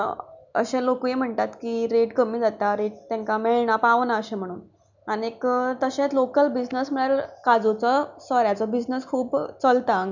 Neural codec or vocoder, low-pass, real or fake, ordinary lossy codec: none; 7.2 kHz; real; none